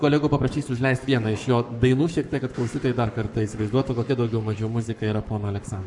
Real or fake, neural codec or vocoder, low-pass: fake; codec, 44.1 kHz, 7.8 kbps, Pupu-Codec; 10.8 kHz